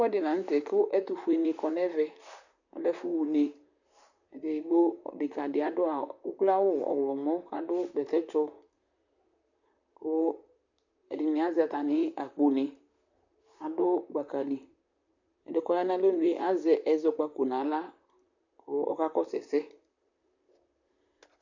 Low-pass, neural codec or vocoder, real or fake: 7.2 kHz; vocoder, 44.1 kHz, 128 mel bands, Pupu-Vocoder; fake